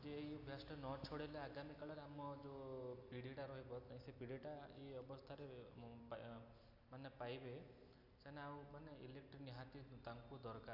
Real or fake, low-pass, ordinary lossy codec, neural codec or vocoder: real; 5.4 kHz; none; none